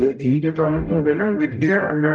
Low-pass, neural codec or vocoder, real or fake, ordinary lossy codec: 9.9 kHz; codec, 44.1 kHz, 0.9 kbps, DAC; fake; Opus, 32 kbps